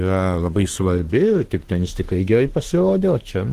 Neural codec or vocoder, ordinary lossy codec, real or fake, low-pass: autoencoder, 48 kHz, 32 numbers a frame, DAC-VAE, trained on Japanese speech; Opus, 16 kbps; fake; 14.4 kHz